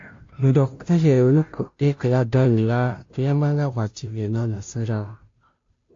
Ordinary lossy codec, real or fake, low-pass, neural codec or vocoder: AAC, 48 kbps; fake; 7.2 kHz; codec, 16 kHz, 0.5 kbps, FunCodec, trained on Chinese and English, 25 frames a second